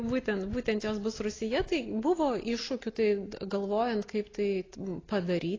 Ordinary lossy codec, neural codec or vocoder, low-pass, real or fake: AAC, 32 kbps; none; 7.2 kHz; real